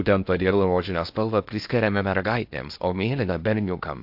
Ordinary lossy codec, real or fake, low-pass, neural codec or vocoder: AAC, 48 kbps; fake; 5.4 kHz; codec, 16 kHz in and 24 kHz out, 0.6 kbps, FocalCodec, streaming, 2048 codes